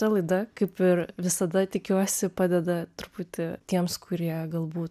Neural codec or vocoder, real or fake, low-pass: none; real; 14.4 kHz